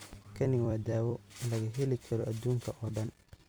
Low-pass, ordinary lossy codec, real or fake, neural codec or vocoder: none; none; fake; vocoder, 44.1 kHz, 128 mel bands every 256 samples, BigVGAN v2